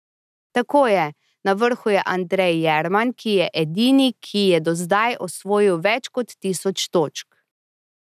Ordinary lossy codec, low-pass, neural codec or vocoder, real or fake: none; 14.4 kHz; none; real